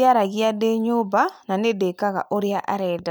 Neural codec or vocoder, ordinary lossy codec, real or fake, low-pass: none; none; real; none